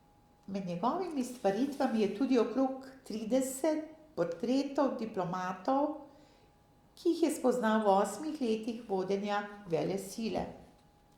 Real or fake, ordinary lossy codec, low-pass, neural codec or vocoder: real; Opus, 64 kbps; 19.8 kHz; none